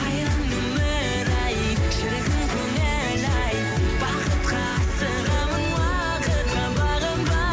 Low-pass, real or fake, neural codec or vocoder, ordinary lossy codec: none; real; none; none